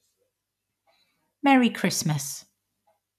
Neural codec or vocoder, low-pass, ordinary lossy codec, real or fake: none; 14.4 kHz; MP3, 96 kbps; real